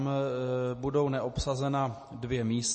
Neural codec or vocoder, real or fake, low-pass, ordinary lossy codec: none; real; 10.8 kHz; MP3, 32 kbps